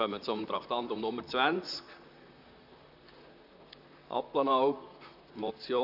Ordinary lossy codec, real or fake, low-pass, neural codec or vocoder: none; fake; 5.4 kHz; vocoder, 44.1 kHz, 128 mel bands, Pupu-Vocoder